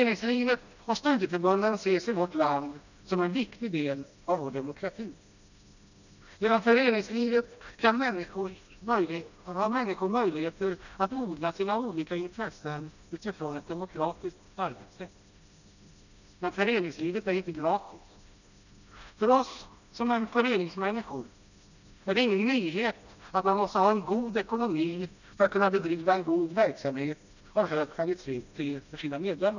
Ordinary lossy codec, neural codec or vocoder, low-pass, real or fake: none; codec, 16 kHz, 1 kbps, FreqCodec, smaller model; 7.2 kHz; fake